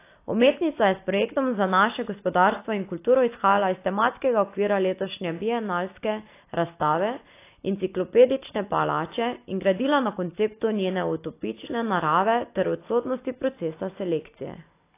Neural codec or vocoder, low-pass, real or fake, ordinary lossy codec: none; 3.6 kHz; real; AAC, 24 kbps